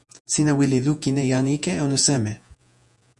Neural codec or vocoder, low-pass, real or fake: vocoder, 48 kHz, 128 mel bands, Vocos; 10.8 kHz; fake